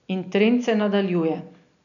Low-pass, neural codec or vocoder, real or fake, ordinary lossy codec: 7.2 kHz; none; real; none